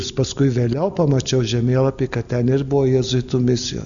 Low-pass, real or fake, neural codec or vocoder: 7.2 kHz; real; none